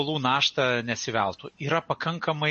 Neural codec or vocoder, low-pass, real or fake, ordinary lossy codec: none; 10.8 kHz; real; MP3, 32 kbps